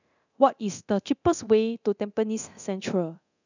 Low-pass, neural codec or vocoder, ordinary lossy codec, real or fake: 7.2 kHz; codec, 24 kHz, 0.9 kbps, DualCodec; none; fake